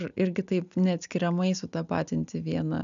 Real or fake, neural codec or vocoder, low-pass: real; none; 7.2 kHz